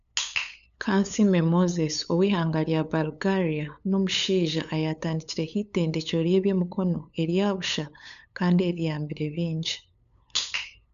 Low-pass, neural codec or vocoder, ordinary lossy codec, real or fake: 7.2 kHz; codec, 16 kHz, 8 kbps, FunCodec, trained on LibriTTS, 25 frames a second; none; fake